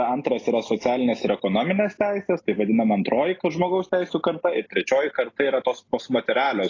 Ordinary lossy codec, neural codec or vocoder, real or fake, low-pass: AAC, 32 kbps; none; real; 7.2 kHz